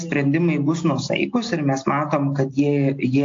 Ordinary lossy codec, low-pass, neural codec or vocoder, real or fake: AAC, 48 kbps; 7.2 kHz; none; real